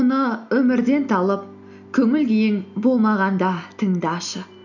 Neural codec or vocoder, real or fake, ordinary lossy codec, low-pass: none; real; none; 7.2 kHz